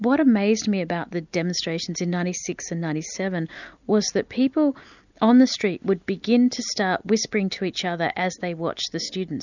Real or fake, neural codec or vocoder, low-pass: real; none; 7.2 kHz